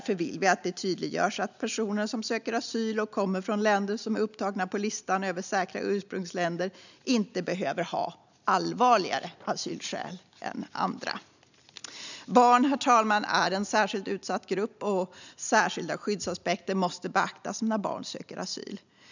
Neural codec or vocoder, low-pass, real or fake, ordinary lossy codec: none; 7.2 kHz; real; none